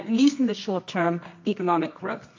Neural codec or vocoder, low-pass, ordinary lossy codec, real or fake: codec, 24 kHz, 0.9 kbps, WavTokenizer, medium music audio release; 7.2 kHz; MP3, 48 kbps; fake